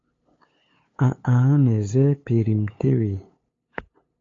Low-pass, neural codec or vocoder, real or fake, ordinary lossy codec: 7.2 kHz; codec, 16 kHz, 8 kbps, FunCodec, trained on LibriTTS, 25 frames a second; fake; AAC, 32 kbps